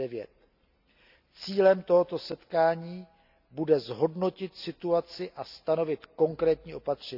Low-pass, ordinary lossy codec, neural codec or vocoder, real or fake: 5.4 kHz; none; none; real